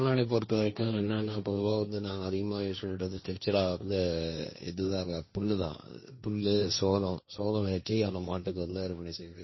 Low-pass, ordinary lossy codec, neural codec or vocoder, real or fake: 7.2 kHz; MP3, 24 kbps; codec, 16 kHz, 1.1 kbps, Voila-Tokenizer; fake